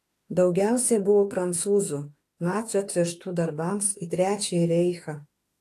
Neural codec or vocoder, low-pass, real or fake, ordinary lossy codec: autoencoder, 48 kHz, 32 numbers a frame, DAC-VAE, trained on Japanese speech; 14.4 kHz; fake; AAC, 48 kbps